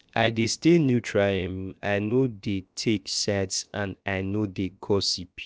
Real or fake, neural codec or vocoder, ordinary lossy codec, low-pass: fake; codec, 16 kHz, 0.7 kbps, FocalCodec; none; none